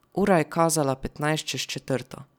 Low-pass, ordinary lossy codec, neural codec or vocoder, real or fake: 19.8 kHz; none; none; real